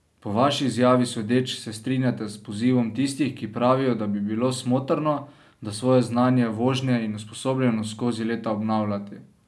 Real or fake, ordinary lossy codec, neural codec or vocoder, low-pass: real; none; none; none